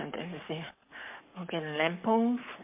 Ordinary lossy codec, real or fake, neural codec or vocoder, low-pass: MP3, 32 kbps; fake; codec, 44.1 kHz, 7.8 kbps, Pupu-Codec; 3.6 kHz